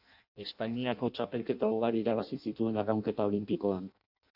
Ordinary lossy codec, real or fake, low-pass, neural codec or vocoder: AAC, 48 kbps; fake; 5.4 kHz; codec, 16 kHz in and 24 kHz out, 0.6 kbps, FireRedTTS-2 codec